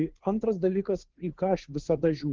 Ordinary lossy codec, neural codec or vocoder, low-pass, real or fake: Opus, 16 kbps; codec, 16 kHz, 2 kbps, FunCodec, trained on Chinese and English, 25 frames a second; 7.2 kHz; fake